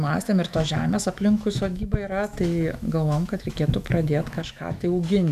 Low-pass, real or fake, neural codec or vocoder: 14.4 kHz; fake; autoencoder, 48 kHz, 128 numbers a frame, DAC-VAE, trained on Japanese speech